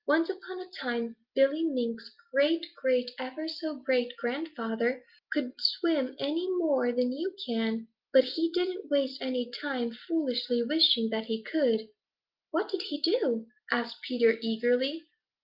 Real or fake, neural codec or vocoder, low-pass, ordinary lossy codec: real; none; 5.4 kHz; Opus, 24 kbps